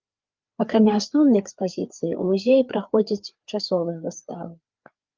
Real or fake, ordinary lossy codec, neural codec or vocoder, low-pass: fake; Opus, 24 kbps; codec, 16 kHz, 4 kbps, FreqCodec, larger model; 7.2 kHz